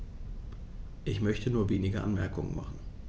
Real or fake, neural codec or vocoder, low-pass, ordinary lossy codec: real; none; none; none